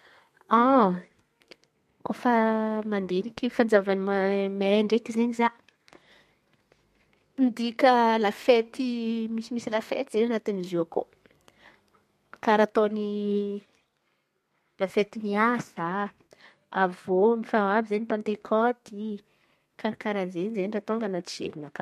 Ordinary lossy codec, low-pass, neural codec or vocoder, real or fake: MP3, 64 kbps; 14.4 kHz; codec, 32 kHz, 1.9 kbps, SNAC; fake